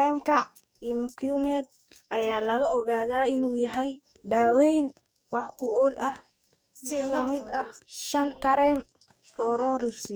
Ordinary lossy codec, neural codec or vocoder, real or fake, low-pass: none; codec, 44.1 kHz, 2.6 kbps, DAC; fake; none